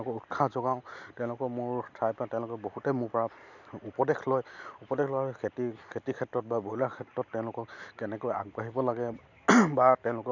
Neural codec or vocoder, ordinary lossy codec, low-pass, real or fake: none; none; 7.2 kHz; real